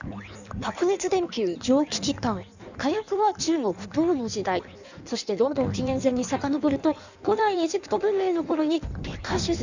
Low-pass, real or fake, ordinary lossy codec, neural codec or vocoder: 7.2 kHz; fake; none; codec, 16 kHz in and 24 kHz out, 1.1 kbps, FireRedTTS-2 codec